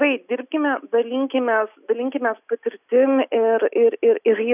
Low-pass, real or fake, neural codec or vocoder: 3.6 kHz; real; none